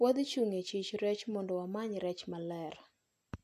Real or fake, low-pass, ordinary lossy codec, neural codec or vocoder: real; 14.4 kHz; AAC, 64 kbps; none